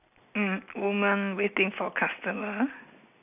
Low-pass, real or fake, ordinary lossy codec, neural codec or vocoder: 3.6 kHz; real; none; none